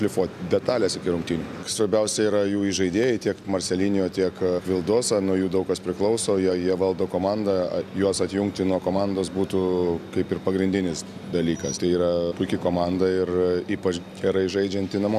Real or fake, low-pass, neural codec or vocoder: real; 14.4 kHz; none